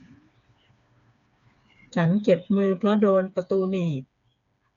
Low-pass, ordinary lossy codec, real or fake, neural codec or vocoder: 7.2 kHz; none; fake; codec, 16 kHz, 4 kbps, FreqCodec, smaller model